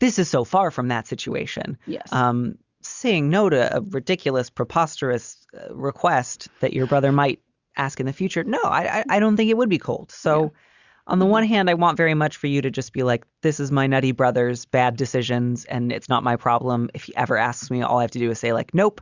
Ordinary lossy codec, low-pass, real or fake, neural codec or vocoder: Opus, 64 kbps; 7.2 kHz; real; none